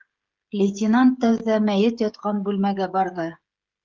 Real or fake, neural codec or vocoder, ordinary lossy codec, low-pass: fake; codec, 16 kHz, 16 kbps, FreqCodec, smaller model; Opus, 32 kbps; 7.2 kHz